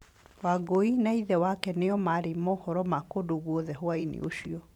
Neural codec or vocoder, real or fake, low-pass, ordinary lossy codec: vocoder, 44.1 kHz, 128 mel bands every 512 samples, BigVGAN v2; fake; 19.8 kHz; none